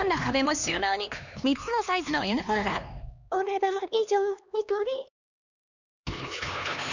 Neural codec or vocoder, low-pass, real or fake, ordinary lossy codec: codec, 16 kHz, 2 kbps, X-Codec, HuBERT features, trained on LibriSpeech; 7.2 kHz; fake; none